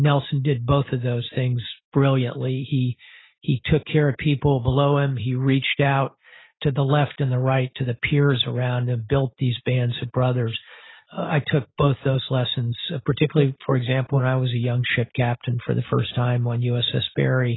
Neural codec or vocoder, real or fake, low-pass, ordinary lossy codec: none; real; 7.2 kHz; AAC, 16 kbps